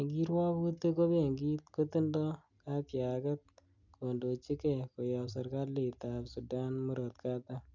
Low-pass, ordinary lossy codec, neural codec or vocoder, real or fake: 7.2 kHz; none; none; real